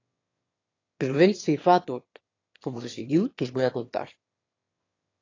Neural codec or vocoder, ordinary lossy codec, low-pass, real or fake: autoencoder, 22.05 kHz, a latent of 192 numbers a frame, VITS, trained on one speaker; AAC, 32 kbps; 7.2 kHz; fake